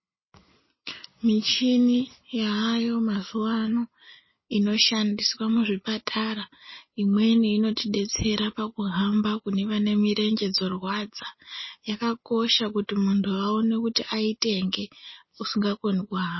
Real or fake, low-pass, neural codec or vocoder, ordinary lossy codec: real; 7.2 kHz; none; MP3, 24 kbps